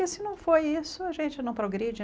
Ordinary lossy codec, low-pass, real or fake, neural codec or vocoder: none; none; real; none